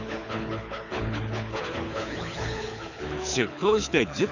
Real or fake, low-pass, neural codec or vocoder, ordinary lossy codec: fake; 7.2 kHz; codec, 24 kHz, 6 kbps, HILCodec; none